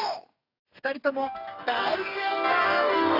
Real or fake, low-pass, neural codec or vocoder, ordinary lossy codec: fake; 5.4 kHz; codec, 44.1 kHz, 2.6 kbps, DAC; AAC, 32 kbps